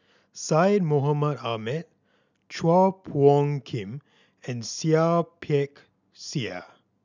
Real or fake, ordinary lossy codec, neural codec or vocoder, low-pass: real; none; none; 7.2 kHz